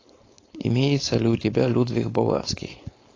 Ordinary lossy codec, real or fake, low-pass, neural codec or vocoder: AAC, 32 kbps; fake; 7.2 kHz; codec, 16 kHz, 4.8 kbps, FACodec